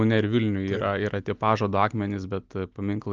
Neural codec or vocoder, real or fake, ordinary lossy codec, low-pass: none; real; Opus, 24 kbps; 7.2 kHz